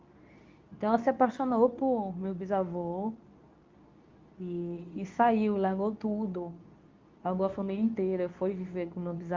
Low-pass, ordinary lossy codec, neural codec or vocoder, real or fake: 7.2 kHz; Opus, 24 kbps; codec, 24 kHz, 0.9 kbps, WavTokenizer, medium speech release version 2; fake